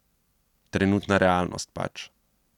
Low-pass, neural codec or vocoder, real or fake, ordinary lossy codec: 19.8 kHz; none; real; none